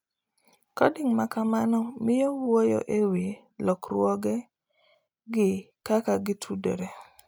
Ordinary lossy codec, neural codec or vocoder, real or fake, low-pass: none; none; real; none